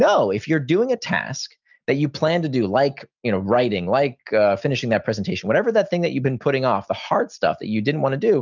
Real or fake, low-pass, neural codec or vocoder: real; 7.2 kHz; none